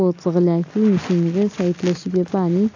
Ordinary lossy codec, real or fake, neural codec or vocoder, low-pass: AAC, 48 kbps; real; none; 7.2 kHz